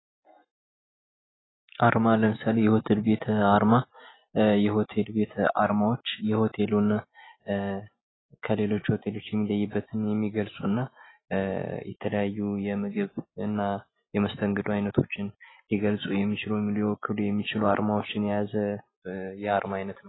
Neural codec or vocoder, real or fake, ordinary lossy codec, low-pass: none; real; AAC, 16 kbps; 7.2 kHz